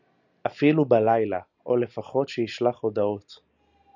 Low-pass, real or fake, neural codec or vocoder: 7.2 kHz; real; none